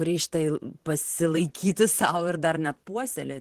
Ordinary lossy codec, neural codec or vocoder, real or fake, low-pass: Opus, 16 kbps; vocoder, 44.1 kHz, 128 mel bands, Pupu-Vocoder; fake; 14.4 kHz